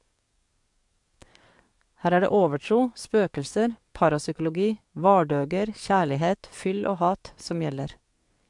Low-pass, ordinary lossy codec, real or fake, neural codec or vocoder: 10.8 kHz; MP3, 64 kbps; fake; codec, 44.1 kHz, 7.8 kbps, DAC